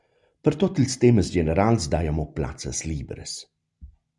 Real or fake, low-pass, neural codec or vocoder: real; 10.8 kHz; none